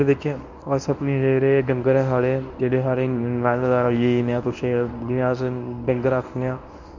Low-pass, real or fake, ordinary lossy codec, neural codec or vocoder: 7.2 kHz; fake; none; codec, 24 kHz, 0.9 kbps, WavTokenizer, medium speech release version 1